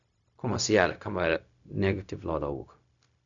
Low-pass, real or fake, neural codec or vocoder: 7.2 kHz; fake; codec, 16 kHz, 0.4 kbps, LongCat-Audio-Codec